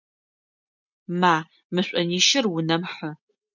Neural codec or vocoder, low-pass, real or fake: none; 7.2 kHz; real